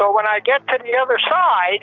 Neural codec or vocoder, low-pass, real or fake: none; 7.2 kHz; real